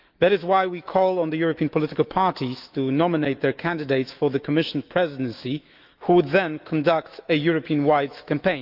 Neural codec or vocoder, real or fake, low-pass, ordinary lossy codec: autoencoder, 48 kHz, 128 numbers a frame, DAC-VAE, trained on Japanese speech; fake; 5.4 kHz; Opus, 24 kbps